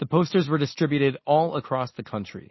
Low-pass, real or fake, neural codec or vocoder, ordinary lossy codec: 7.2 kHz; real; none; MP3, 24 kbps